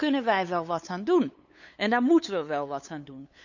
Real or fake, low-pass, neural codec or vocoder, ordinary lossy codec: fake; 7.2 kHz; codec, 16 kHz, 8 kbps, FunCodec, trained on LibriTTS, 25 frames a second; none